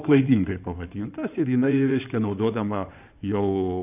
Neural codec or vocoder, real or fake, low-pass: codec, 16 kHz in and 24 kHz out, 2.2 kbps, FireRedTTS-2 codec; fake; 3.6 kHz